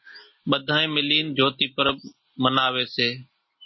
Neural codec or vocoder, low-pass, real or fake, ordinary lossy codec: none; 7.2 kHz; real; MP3, 24 kbps